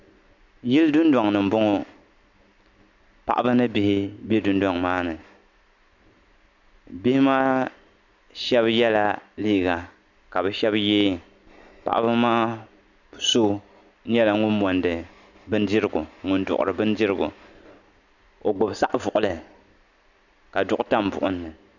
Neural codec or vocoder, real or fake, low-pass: none; real; 7.2 kHz